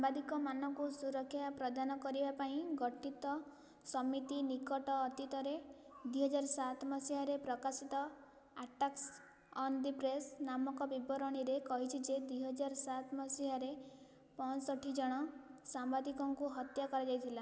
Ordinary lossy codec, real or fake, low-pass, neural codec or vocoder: none; real; none; none